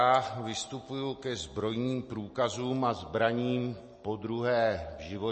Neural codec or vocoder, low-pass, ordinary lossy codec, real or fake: none; 10.8 kHz; MP3, 32 kbps; real